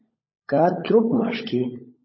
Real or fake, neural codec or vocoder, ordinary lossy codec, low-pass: fake; codec, 16 kHz, 16 kbps, FunCodec, trained on LibriTTS, 50 frames a second; MP3, 24 kbps; 7.2 kHz